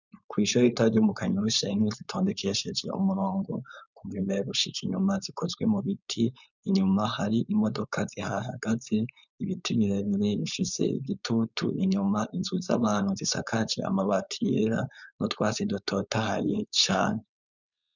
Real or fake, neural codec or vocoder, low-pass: fake; codec, 16 kHz, 4.8 kbps, FACodec; 7.2 kHz